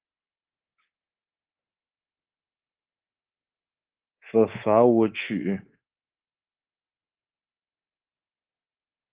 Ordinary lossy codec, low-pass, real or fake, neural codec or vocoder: Opus, 16 kbps; 3.6 kHz; fake; codec, 24 kHz, 3.1 kbps, DualCodec